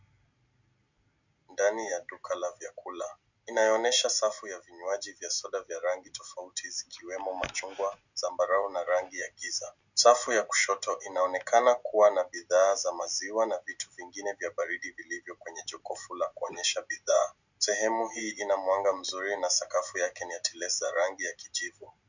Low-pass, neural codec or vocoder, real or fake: 7.2 kHz; none; real